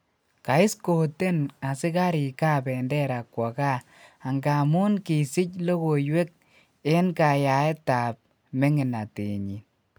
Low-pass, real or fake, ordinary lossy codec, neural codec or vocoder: none; real; none; none